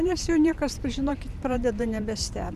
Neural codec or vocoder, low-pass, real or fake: vocoder, 44.1 kHz, 128 mel bands every 512 samples, BigVGAN v2; 14.4 kHz; fake